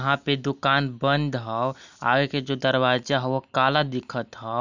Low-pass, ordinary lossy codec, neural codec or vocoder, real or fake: 7.2 kHz; none; none; real